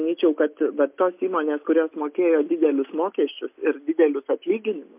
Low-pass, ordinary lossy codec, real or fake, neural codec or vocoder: 3.6 kHz; MP3, 32 kbps; real; none